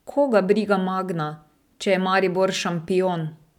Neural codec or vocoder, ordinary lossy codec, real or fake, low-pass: none; none; real; 19.8 kHz